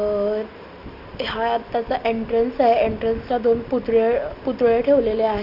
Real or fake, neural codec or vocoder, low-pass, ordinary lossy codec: real; none; 5.4 kHz; none